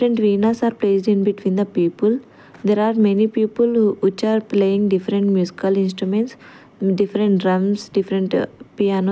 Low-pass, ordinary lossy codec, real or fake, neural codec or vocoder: none; none; real; none